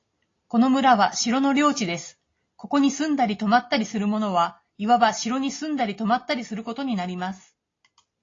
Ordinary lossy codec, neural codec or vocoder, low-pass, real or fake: AAC, 32 kbps; none; 7.2 kHz; real